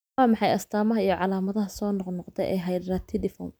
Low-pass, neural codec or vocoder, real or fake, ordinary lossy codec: none; none; real; none